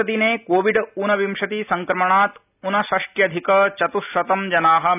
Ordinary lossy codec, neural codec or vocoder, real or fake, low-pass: none; none; real; 3.6 kHz